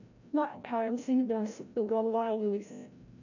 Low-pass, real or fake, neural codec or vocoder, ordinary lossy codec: 7.2 kHz; fake; codec, 16 kHz, 0.5 kbps, FreqCodec, larger model; none